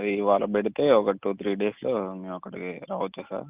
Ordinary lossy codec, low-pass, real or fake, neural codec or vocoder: Opus, 32 kbps; 3.6 kHz; real; none